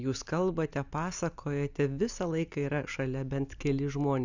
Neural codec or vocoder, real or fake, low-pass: none; real; 7.2 kHz